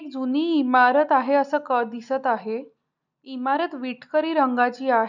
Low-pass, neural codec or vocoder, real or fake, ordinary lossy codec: 7.2 kHz; none; real; none